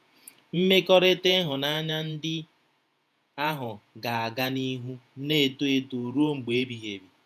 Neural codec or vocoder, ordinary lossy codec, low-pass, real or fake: vocoder, 48 kHz, 128 mel bands, Vocos; none; 14.4 kHz; fake